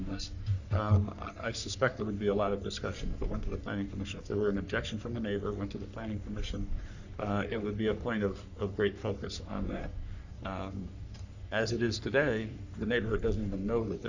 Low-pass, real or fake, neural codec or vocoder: 7.2 kHz; fake; codec, 44.1 kHz, 3.4 kbps, Pupu-Codec